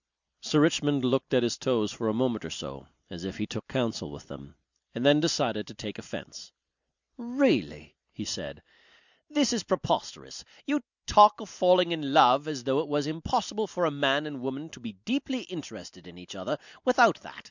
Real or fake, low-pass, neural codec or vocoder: real; 7.2 kHz; none